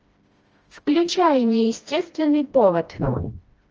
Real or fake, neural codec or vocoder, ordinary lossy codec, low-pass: fake; codec, 16 kHz, 1 kbps, FreqCodec, smaller model; Opus, 24 kbps; 7.2 kHz